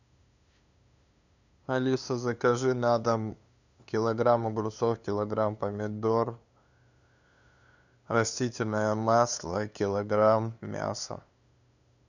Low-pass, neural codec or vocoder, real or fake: 7.2 kHz; codec, 16 kHz, 2 kbps, FunCodec, trained on LibriTTS, 25 frames a second; fake